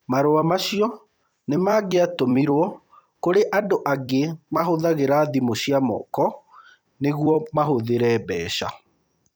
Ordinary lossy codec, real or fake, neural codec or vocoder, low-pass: none; fake; vocoder, 44.1 kHz, 128 mel bands every 256 samples, BigVGAN v2; none